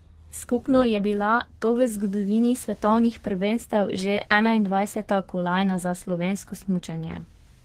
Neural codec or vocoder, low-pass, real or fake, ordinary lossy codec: codec, 32 kHz, 1.9 kbps, SNAC; 14.4 kHz; fake; Opus, 16 kbps